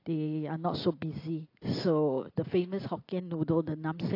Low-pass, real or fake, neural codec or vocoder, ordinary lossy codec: 5.4 kHz; real; none; AAC, 32 kbps